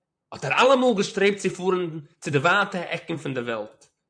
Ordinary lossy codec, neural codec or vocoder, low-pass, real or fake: MP3, 96 kbps; vocoder, 44.1 kHz, 128 mel bands, Pupu-Vocoder; 9.9 kHz; fake